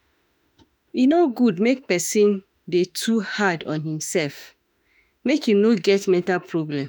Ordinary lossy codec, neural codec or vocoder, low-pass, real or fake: none; autoencoder, 48 kHz, 32 numbers a frame, DAC-VAE, trained on Japanese speech; none; fake